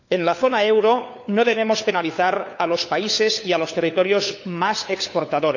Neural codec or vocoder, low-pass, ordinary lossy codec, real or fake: codec, 16 kHz, 4 kbps, FunCodec, trained on LibriTTS, 50 frames a second; 7.2 kHz; none; fake